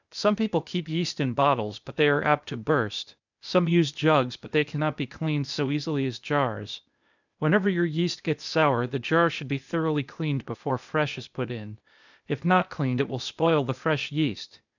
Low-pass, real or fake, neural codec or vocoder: 7.2 kHz; fake; codec, 16 kHz, 0.8 kbps, ZipCodec